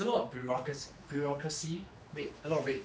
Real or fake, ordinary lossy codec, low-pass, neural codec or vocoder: fake; none; none; codec, 16 kHz, 2 kbps, X-Codec, HuBERT features, trained on general audio